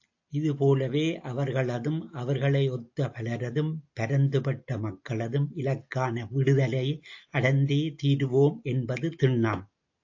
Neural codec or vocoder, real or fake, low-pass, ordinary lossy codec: none; real; 7.2 kHz; AAC, 48 kbps